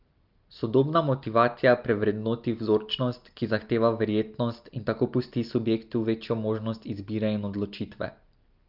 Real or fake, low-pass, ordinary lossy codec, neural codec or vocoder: real; 5.4 kHz; Opus, 24 kbps; none